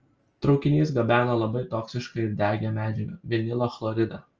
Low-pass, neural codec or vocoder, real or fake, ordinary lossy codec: 7.2 kHz; none; real; Opus, 24 kbps